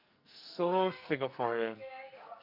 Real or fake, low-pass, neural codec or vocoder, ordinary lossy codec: fake; 5.4 kHz; codec, 44.1 kHz, 2.6 kbps, SNAC; none